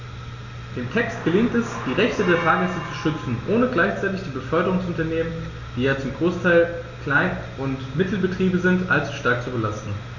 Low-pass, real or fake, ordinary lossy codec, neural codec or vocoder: 7.2 kHz; real; Opus, 64 kbps; none